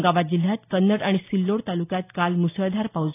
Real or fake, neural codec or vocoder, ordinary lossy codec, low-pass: real; none; AAC, 24 kbps; 3.6 kHz